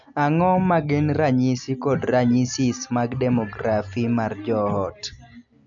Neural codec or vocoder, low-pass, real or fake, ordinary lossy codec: none; 7.2 kHz; real; MP3, 64 kbps